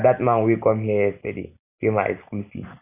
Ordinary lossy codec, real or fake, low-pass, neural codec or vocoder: none; real; 3.6 kHz; none